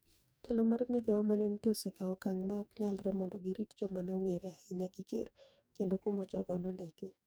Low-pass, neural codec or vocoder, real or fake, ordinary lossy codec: none; codec, 44.1 kHz, 2.6 kbps, DAC; fake; none